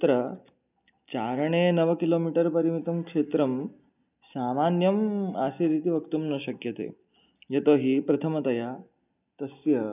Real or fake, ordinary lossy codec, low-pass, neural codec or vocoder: real; none; 3.6 kHz; none